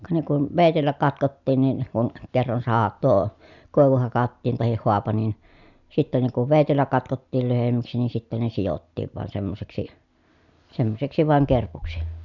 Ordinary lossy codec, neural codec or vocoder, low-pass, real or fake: none; none; 7.2 kHz; real